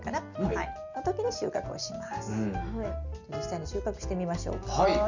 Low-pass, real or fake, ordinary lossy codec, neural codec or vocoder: 7.2 kHz; real; none; none